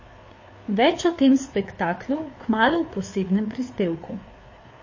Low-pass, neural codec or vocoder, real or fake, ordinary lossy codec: 7.2 kHz; codec, 16 kHz, 4 kbps, FreqCodec, larger model; fake; MP3, 32 kbps